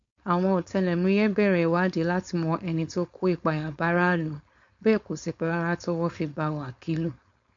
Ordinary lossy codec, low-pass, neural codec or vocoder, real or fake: MP3, 48 kbps; 7.2 kHz; codec, 16 kHz, 4.8 kbps, FACodec; fake